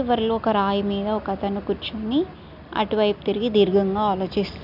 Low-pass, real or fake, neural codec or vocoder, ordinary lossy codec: 5.4 kHz; real; none; none